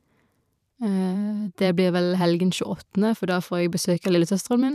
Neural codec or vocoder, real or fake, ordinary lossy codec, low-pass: vocoder, 44.1 kHz, 128 mel bands every 512 samples, BigVGAN v2; fake; none; 14.4 kHz